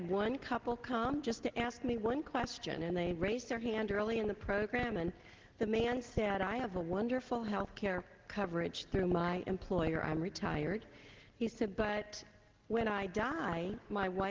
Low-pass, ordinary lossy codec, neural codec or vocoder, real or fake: 7.2 kHz; Opus, 16 kbps; none; real